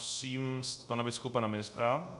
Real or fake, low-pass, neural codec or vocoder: fake; 10.8 kHz; codec, 24 kHz, 0.5 kbps, DualCodec